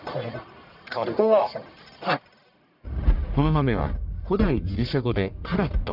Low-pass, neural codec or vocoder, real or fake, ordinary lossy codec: 5.4 kHz; codec, 44.1 kHz, 1.7 kbps, Pupu-Codec; fake; none